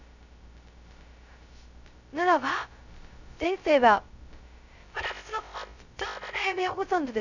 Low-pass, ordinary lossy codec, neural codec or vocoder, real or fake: 7.2 kHz; none; codec, 16 kHz, 0.2 kbps, FocalCodec; fake